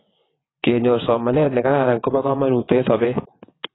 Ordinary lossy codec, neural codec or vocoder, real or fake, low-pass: AAC, 16 kbps; vocoder, 22.05 kHz, 80 mel bands, WaveNeXt; fake; 7.2 kHz